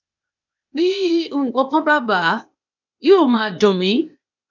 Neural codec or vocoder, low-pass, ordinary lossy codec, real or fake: codec, 16 kHz, 0.8 kbps, ZipCodec; 7.2 kHz; none; fake